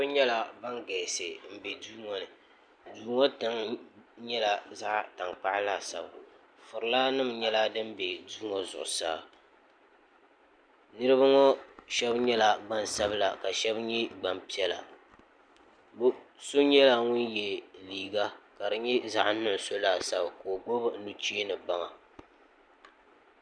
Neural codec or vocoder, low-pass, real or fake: none; 9.9 kHz; real